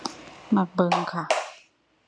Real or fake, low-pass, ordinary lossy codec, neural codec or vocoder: real; none; none; none